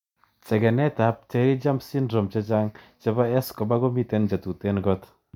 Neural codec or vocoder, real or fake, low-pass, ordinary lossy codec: none; real; 19.8 kHz; none